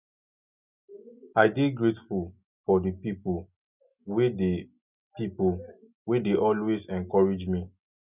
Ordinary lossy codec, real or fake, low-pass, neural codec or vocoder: none; real; 3.6 kHz; none